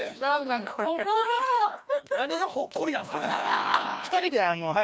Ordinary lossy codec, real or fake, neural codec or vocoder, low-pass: none; fake; codec, 16 kHz, 1 kbps, FreqCodec, larger model; none